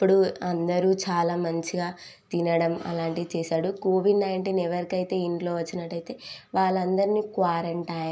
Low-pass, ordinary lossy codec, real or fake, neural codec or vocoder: none; none; real; none